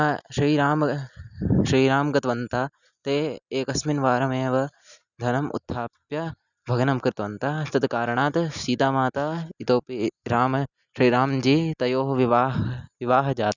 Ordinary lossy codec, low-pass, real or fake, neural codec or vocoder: none; 7.2 kHz; real; none